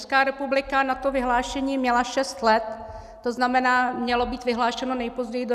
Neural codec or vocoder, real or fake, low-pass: none; real; 14.4 kHz